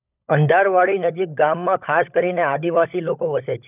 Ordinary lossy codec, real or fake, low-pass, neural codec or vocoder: none; fake; 3.6 kHz; codec, 16 kHz, 16 kbps, FunCodec, trained on LibriTTS, 50 frames a second